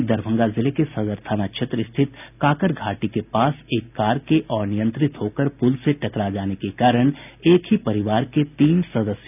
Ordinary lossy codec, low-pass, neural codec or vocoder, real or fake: none; 3.6 kHz; none; real